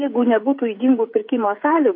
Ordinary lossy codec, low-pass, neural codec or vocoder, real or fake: MP3, 32 kbps; 5.4 kHz; codec, 16 kHz, 16 kbps, FreqCodec, smaller model; fake